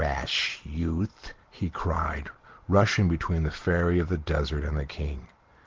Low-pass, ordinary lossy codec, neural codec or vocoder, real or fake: 7.2 kHz; Opus, 16 kbps; none; real